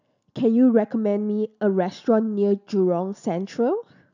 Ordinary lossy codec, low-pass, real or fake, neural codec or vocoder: none; 7.2 kHz; real; none